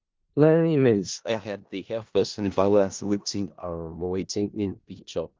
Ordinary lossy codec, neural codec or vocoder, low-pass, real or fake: Opus, 32 kbps; codec, 16 kHz in and 24 kHz out, 0.4 kbps, LongCat-Audio-Codec, four codebook decoder; 7.2 kHz; fake